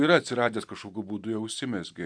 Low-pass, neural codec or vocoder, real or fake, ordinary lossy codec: 9.9 kHz; none; real; MP3, 96 kbps